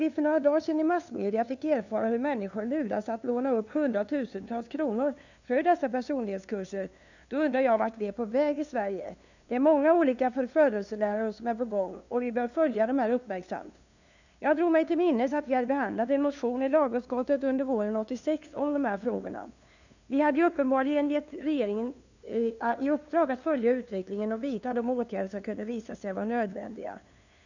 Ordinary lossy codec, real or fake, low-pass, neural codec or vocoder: none; fake; 7.2 kHz; codec, 16 kHz, 2 kbps, FunCodec, trained on LibriTTS, 25 frames a second